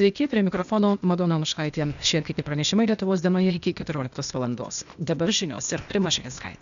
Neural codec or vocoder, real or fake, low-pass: codec, 16 kHz, 0.8 kbps, ZipCodec; fake; 7.2 kHz